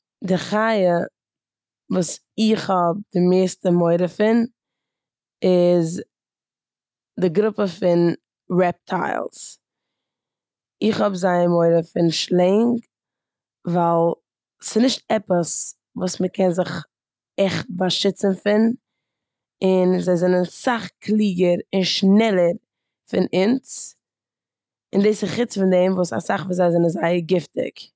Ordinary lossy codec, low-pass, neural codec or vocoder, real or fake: none; none; none; real